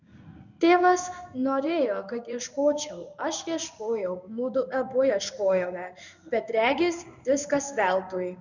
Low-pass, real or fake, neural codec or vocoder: 7.2 kHz; fake; codec, 16 kHz in and 24 kHz out, 1 kbps, XY-Tokenizer